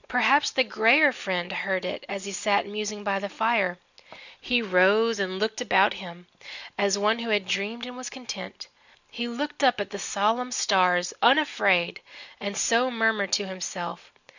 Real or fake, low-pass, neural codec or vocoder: real; 7.2 kHz; none